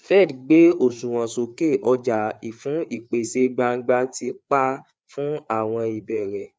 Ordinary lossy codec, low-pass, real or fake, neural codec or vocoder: none; none; fake; codec, 16 kHz, 4 kbps, FreqCodec, larger model